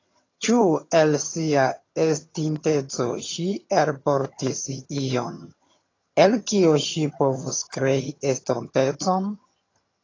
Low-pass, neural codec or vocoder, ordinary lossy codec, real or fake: 7.2 kHz; vocoder, 22.05 kHz, 80 mel bands, HiFi-GAN; AAC, 32 kbps; fake